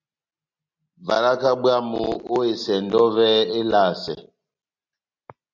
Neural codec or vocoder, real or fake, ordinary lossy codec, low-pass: none; real; MP3, 48 kbps; 7.2 kHz